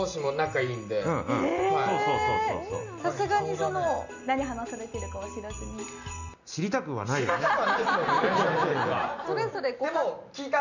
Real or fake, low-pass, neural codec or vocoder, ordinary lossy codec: real; 7.2 kHz; none; none